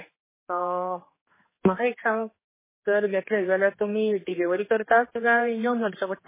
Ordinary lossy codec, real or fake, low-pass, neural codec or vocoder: MP3, 16 kbps; fake; 3.6 kHz; codec, 16 kHz, 2 kbps, X-Codec, HuBERT features, trained on general audio